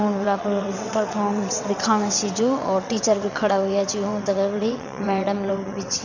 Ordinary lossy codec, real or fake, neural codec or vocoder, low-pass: none; fake; vocoder, 22.05 kHz, 80 mel bands, WaveNeXt; 7.2 kHz